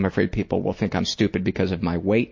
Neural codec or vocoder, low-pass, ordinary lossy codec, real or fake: none; 7.2 kHz; MP3, 32 kbps; real